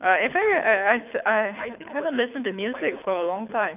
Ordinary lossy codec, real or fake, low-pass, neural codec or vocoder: none; fake; 3.6 kHz; codec, 24 kHz, 6 kbps, HILCodec